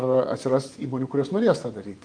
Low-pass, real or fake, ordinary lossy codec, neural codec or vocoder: 9.9 kHz; fake; Opus, 32 kbps; vocoder, 24 kHz, 100 mel bands, Vocos